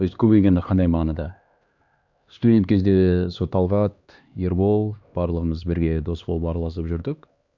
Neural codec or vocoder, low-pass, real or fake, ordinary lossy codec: codec, 16 kHz, 2 kbps, X-Codec, HuBERT features, trained on LibriSpeech; 7.2 kHz; fake; Opus, 64 kbps